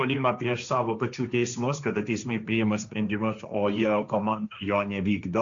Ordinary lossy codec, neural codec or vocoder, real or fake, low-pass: Opus, 64 kbps; codec, 16 kHz, 1.1 kbps, Voila-Tokenizer; fake; 7.2 kHz